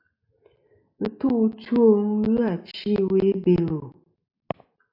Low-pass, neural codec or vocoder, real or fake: 5.4 kHz; none; real